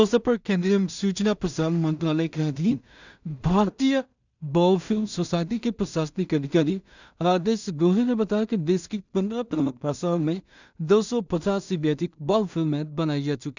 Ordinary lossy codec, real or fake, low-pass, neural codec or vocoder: none; fake; 7.2 kHz; codec, 16 kHz in and 24 kHz out, 0.4 kbps, LongCat-Audio-Codec, two codebook decoder